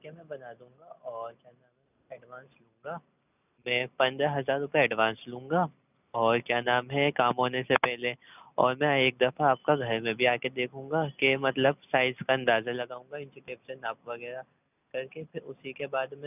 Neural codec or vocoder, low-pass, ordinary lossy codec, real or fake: none; 3.6 kHz; none; real